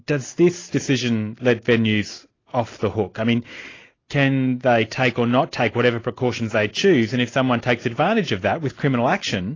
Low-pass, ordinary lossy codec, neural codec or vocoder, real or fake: 7.2 kHz; AAC, 32 kbps; none; real